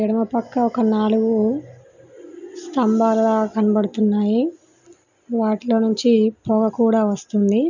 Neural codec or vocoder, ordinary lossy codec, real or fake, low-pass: none; none; real; 7.2 kHz